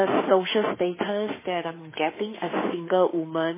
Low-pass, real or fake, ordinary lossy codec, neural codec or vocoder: 3.6 kHz; fake; MP3, 16 kbps; autoencoder, 48 kHz, 32 numbers a frame, DAC-VAE, trained on Japanese speech